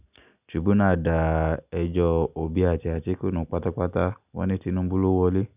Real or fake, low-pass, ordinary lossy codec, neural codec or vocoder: real; 3.6 kHz; none; none